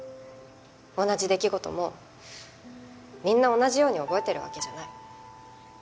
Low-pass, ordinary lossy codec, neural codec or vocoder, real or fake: none; none; none; real